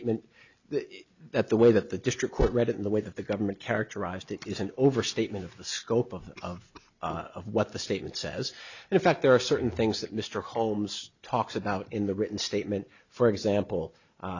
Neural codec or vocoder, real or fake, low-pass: none; real; 7.2 kHz